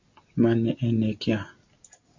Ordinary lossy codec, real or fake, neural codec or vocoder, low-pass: MP3, 48 kbps; real; none; 7.2 kHz